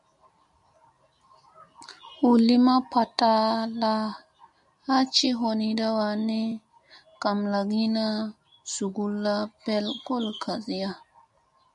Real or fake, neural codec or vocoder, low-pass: real; none; 10.8 kHz